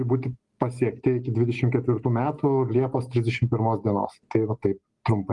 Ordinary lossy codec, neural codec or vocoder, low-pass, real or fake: Opus, 24 kbps; none; 10.8 kHz; real